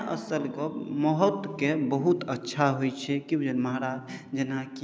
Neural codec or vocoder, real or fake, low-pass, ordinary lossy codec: none; real; none; none